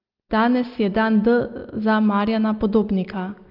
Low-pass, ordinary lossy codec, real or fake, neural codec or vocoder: 5.4 kHz; Opus, 24 kbps; real; none